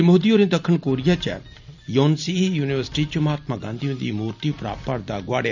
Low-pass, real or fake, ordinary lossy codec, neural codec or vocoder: 7.2 kHz; real; none; none